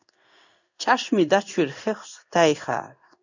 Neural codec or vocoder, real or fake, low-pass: codec, 16 kHz in and 24 kHz out, 1 kbps, XY-Tokenizer; fake; 7.2 kHz